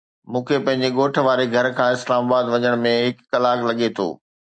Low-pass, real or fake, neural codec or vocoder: 9.9 kHz; real; none